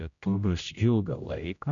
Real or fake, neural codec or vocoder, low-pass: fake; codec, 16 kHz, 0.5 kbps, X-Codec, HuBERT features, trained on general audio; 7.2 kHz